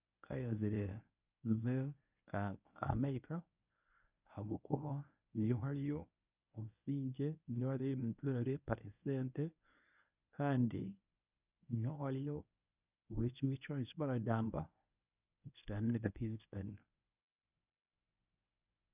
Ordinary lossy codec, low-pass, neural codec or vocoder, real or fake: none; 3.6 kHz; codec, 24 kHz, 0.9 kbps, WavTokenizer, medium speech release version 1; fake